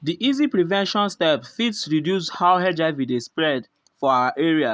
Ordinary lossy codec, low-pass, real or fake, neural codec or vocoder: none; none; real; none